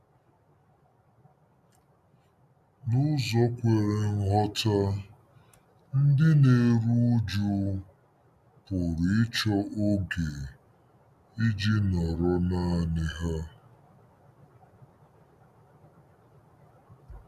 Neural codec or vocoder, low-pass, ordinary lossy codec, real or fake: none; 14.4 kHz; none; real